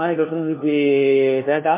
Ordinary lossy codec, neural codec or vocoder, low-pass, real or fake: MP3, 16 kbps; codec, 16 kHz, 1 kbps, FunCodec, trained on LibriTTS, 50 frames a second; 3.6 kHz; fake